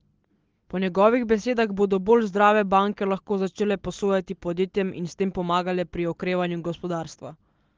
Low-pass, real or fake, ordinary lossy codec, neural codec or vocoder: 7.2 kHz; real; Opus, 16 kbps; none